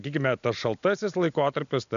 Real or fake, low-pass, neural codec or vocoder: real; 7.2 kHz; none